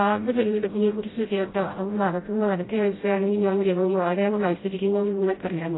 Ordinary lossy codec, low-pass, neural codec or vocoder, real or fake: AAC, 16 kbps; 7.2 kHz; codec, 16 kHz, 0.5 kbps, FreqCodec, smaller model; fake